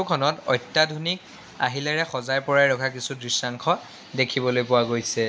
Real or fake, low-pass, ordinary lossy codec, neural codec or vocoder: real; none; none; none